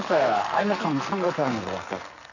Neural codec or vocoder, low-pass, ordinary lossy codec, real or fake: codec, 44.1 kHz, 2.6 kbps, SNAC; 7.2 kHz; none; fake